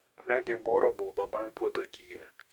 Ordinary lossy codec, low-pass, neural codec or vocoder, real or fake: MP3, 96 kbps; 19.8 kHz; codec, 44.1 kHz, 2.6 kbps, DAC; fake